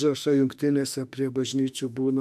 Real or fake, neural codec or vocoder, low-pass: fake; autoencoder, 48 kHz, 32 numbers a frame, DAC-VAE, trained on Japanese speech; 14.4 kHz